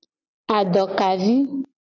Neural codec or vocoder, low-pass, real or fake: none; 7.2 kHz; real